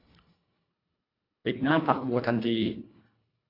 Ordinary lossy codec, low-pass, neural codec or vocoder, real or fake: AAC, 32 kbps; 5.4 kHz; codec, 24 kHz, 1.5 kbps, HILCodec; fake